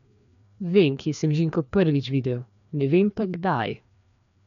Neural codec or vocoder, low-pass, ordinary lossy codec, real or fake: codec, 16 kHz, 2 kbps, FreqCodec, larger model; 7.2 kHz; MP3, 96 kbps; fake